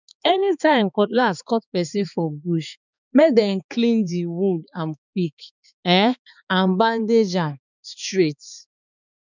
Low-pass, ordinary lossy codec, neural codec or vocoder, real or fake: 7.2 kHz; none; codec, 16 kHz, 4 kbps, X-Codec, HuBERT features, trained on balanced general audio; fake